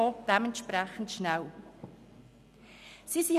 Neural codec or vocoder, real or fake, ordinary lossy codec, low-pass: none; real; none; 14.4 kHz